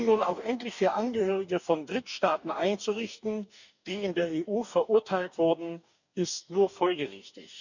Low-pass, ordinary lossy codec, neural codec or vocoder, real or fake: 7.2 kHz; none; codec, 44.1 kHz, 2.6 kbps, DAC; fake